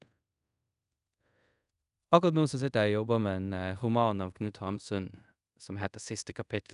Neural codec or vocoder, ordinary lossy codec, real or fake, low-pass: codec, 24 kHz, 0.5 kbps, DualCodec; none; fake; 10.8 kHz